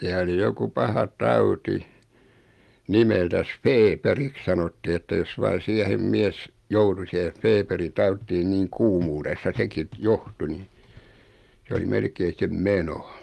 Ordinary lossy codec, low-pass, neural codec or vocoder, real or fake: Opus, 32 kbps; 14.4 kHz; none; real